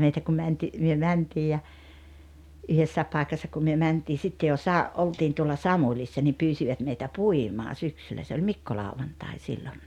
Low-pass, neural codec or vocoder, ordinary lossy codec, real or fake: 19.8 kHz; none; none; real